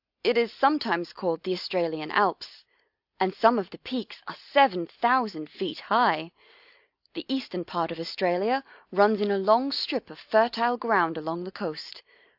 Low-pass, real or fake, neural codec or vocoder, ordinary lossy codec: 5.4 kHz; real; none; AAC, 48 kbps